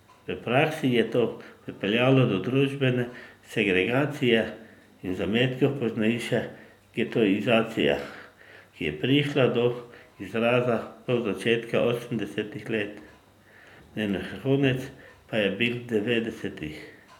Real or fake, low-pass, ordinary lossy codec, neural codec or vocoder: real; 19.8 kHz; none; none